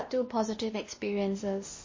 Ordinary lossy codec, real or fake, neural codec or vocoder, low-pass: MP3, 32 kbps; fake; codec, 16 kHz, 1 kbps, X-Codec, WavLM features, trained on Multilingual LibriSpeech; 7.2 kHz